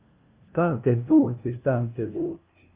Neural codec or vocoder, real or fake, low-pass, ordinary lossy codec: codec, 16 kHz, 0.5 kbps, FunCodec, trained on LibriTTS, 25 frames a second; fake; 3.6 kHz; Opus, 24 kbps